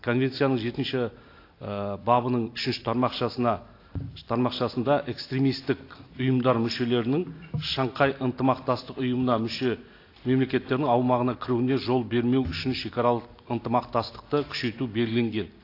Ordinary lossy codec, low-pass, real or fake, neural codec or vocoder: AAC, 32 kbps; 5.4 kHz; real; none